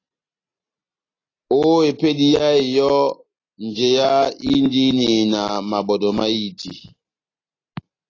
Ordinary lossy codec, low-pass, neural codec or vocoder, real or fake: AAC, 32 kbps; 7.2 kHz; none; real